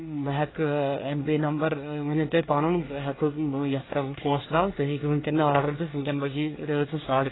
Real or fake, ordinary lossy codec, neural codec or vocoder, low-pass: fake; AAC, 16 kbps; codec, 24 kHz, 1 kbps, SNAC; 7.2 kHz